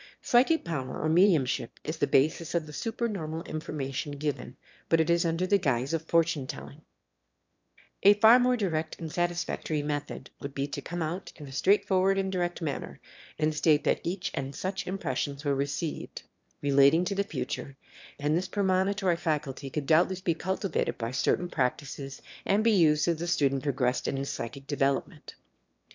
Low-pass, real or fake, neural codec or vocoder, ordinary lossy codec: 7.2 kHz; fake; autoencoder, 22.05 kHz, a latent of 192 numbers a frame, VITS, trained on one speaker; MP3, 64 kbps